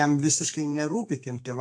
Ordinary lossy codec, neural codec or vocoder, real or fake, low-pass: AAC, 64 kbps; codec, 32 kHz, 1.9 kbps, SNAC; fake; 9.9 kHz